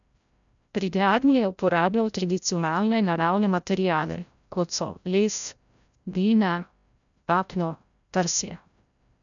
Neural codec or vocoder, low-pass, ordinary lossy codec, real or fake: codec, 16 kHz, 0.5 kbps, FreqCodec, larger model; 7.2 kHz; none; fake